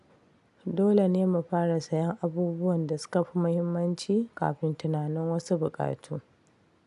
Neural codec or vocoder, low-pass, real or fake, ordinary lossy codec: none; 10.8 kHz; real; none